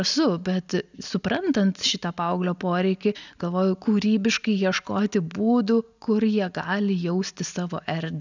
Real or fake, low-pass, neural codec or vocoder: real; 7.2 kHz; none